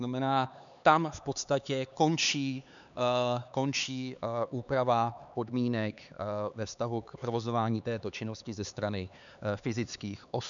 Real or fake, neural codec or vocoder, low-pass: fake; codec, 16 kHz, 4 kbps, X-Codec, HuBERT features, trained on LibriSpeech; 7.2 kHz